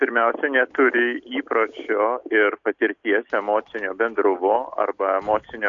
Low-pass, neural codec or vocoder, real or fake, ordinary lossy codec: 7.2 kHz; none; real; AAC, 64 kbps